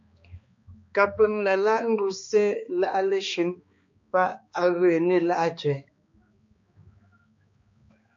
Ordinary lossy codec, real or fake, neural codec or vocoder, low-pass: MP3, 48 kbps; fake; codec, 16 kHz, 2 kbps, X-Codec, HuBERT features, trained on balanced general audio; 7.2 kHz